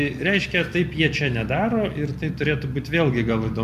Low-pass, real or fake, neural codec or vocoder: 14.4 kHz; real; none